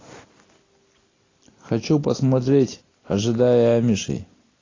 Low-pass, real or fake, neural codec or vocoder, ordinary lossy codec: 7.2 kHz; real; none; AAC, 32 kbps